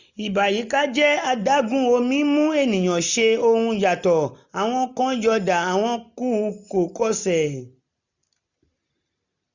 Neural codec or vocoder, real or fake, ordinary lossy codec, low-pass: none; real; none; 7.2 kHz